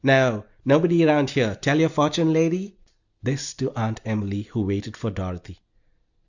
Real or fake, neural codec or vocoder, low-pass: real; none; 7.2 kHz